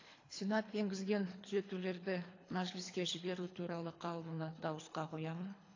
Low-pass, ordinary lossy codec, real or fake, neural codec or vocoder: 7.2 kHz; AAC, 32 kbps; fake; codec, 24 kHz, 3 kbps, HILCodec